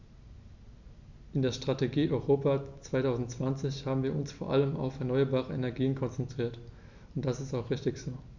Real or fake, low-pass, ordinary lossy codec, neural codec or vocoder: real; 7.2 kHz; none; none